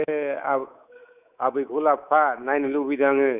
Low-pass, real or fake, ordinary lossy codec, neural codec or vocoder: 3.6 kHz; fake; none; codec, 24 kHz, 3.1 kbps, DualCodec